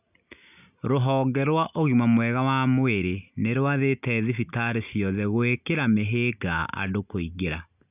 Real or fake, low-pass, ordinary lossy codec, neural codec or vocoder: real; 3.6 kHz; none; none